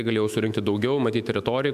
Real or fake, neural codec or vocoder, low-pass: fake; autoencoder, 48 kHz, 128 numbers a frame, DAC-VAE, trained on Japanese speech; 14.4 kHz